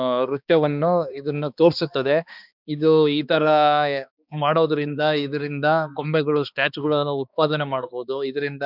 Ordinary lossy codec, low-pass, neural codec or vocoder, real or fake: none; 5.4 kHz; codec, 16 kHz, 2 kbps, X-Codec, HuBERT features, trained on balanced general audio; fake